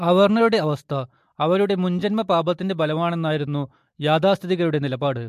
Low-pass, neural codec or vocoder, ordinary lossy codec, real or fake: 14.4 kHz; none; MP3, 64 kbps; real